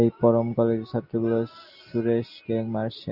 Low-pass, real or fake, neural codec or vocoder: 5.4 kHz; real; none